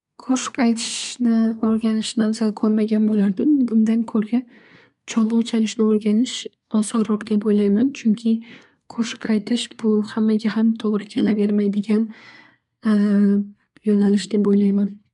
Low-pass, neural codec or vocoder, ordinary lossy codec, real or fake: 10.8 kHz; codec, 24 kHz, 1 kbps, SNAC; none; fake